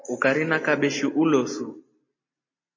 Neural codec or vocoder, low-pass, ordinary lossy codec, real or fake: none; 7.2 kHz; MP3, 32 kbps; real